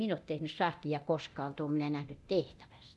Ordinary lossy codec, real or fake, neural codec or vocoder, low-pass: none; fake; codec, 24 kHz, 0.9 kbps, DualCodec; none